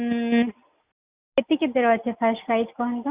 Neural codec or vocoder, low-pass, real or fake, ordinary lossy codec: none; 3.6 kHz; real; Opus, 64 kbps